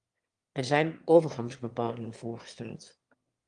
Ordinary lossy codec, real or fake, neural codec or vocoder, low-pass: Opus, 32 kbps; fake; autoencoder, 22.05 kHz, a latent of 192 numbers a frame, VITS, trained on one speaker; 9.9 kHz